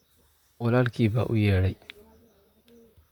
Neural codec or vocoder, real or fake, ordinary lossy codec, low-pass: vocoder, 44.1 kHz, 128 mel bands, Pupu-Vocoder; fake; none; 19.8 kHz